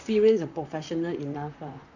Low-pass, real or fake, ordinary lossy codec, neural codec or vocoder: 7.2 kHz; fake; none; vocoder, 44.1 kHz, 128 mel bands, Pupu-Vocoder